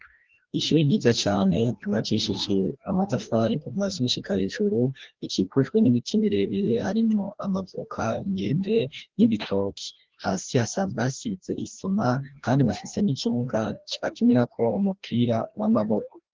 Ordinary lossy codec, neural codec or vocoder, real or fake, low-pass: Opus, 16 kbps; codec, 16 kHz, 1 kbps, FreqCodec, larger model; fake; 7.2 kHz